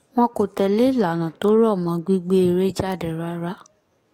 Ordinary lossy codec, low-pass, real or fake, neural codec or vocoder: AAC, 48 kbps; 19.8 kHz; fake; codec, 44.1 kHz, 7.8 kbps, DAC